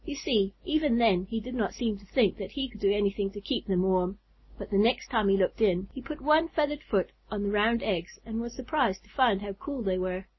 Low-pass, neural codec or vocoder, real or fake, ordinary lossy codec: 7.2 kHz; none; real; MP3, 24 kbps